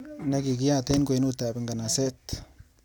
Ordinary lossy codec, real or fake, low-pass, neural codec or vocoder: none; real; 19.8 kHz; none